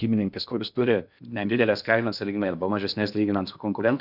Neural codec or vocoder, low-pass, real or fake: codec, 16 kHz in and 24 kHz out, 0.6 kbps, FocalCodec, streaming, 2048 codes; 5.4 kHz; fake